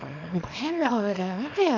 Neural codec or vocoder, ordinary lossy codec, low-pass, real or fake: codec, 24 kHz, 0.9 kbps, WavTokenizer, small release; none; 7.2 kHz; fake